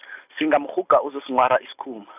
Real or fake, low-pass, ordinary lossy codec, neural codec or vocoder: real; 3.6 kHz; none; none